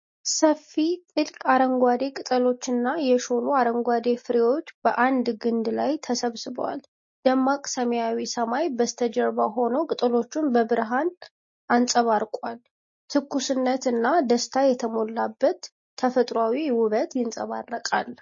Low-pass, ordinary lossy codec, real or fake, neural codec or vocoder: 7.2 kHz; MP3, 32 kbps; real; none